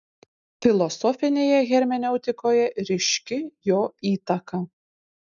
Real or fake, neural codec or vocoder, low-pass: real; none; 7.2 kHz